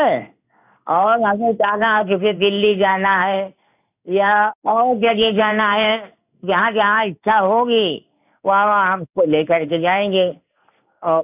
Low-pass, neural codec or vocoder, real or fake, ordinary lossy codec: 3.6 kHz; codec, 16 kHz in and 24 kHz out, 1 kbps, XY-Tokenizer; fake; none